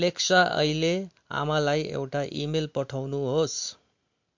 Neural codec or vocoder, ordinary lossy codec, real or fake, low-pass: none; MP3, 48 kbps; real; 7.2 kHz